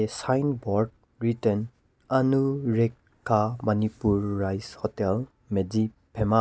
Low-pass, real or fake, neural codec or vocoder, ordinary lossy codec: none; real; none; none